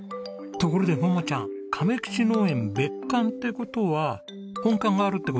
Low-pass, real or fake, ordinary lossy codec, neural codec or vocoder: none; real; none; none